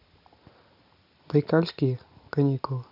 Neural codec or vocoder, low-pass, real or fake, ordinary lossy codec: none; 5.4 kHz; real; none